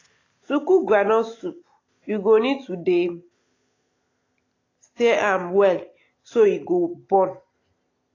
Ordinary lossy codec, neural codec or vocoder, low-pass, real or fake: AAC, 32 kbps; none; 7.2 kHz; real